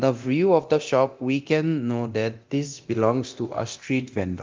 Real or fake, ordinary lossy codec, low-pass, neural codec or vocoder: fake; Opus, 32 kbps; 7.2 kHz; codec, 24 kHz, 0.9 kbps, DualCodec